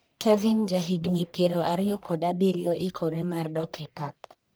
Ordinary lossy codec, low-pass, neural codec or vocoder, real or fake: none; none; codec, 44.1 kHz, 1.7 kbps, Pupu-Codec; fake